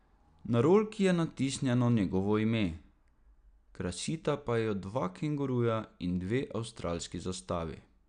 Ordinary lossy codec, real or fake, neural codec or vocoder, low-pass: none; real; none; 9.9 kHz